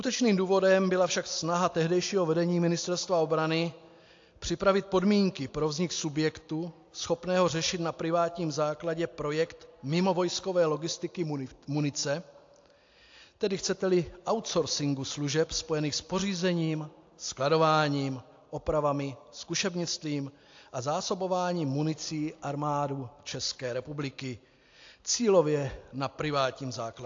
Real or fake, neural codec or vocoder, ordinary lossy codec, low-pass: real; none; AAC, 48 kbps; 7.2 kHz